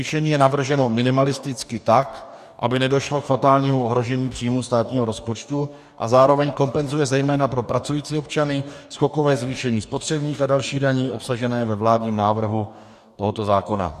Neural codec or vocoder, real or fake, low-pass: codec, 44.1 kHz, 2.6 kbps, DAC; fake; 14.4 kHz